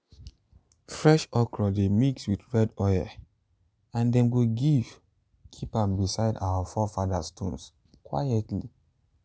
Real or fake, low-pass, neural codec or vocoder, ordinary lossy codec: real; none; none; none